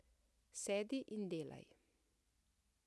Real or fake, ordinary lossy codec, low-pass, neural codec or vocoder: real; none; none; none